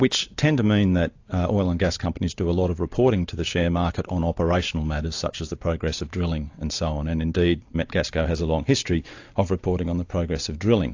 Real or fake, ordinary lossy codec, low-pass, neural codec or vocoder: real; AAC, 48 kbps; 7.2 kHz; none